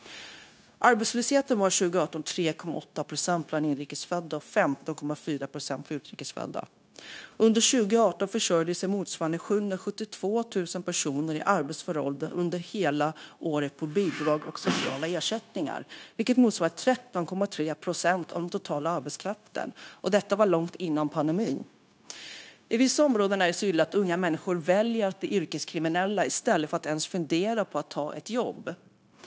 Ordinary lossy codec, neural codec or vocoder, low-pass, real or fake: none; codec, 16 kHz, 0.9 kbps, LongCat-Audio-Codec; none; fake